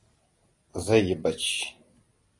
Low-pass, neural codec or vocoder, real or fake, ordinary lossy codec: 10.8 kHz; none; real; AAC, 32 kbps